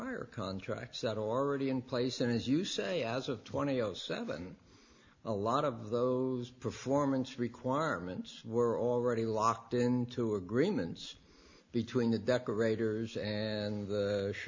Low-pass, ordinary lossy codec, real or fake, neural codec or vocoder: 7.2 kHz; MP3, 48 kbps; real; none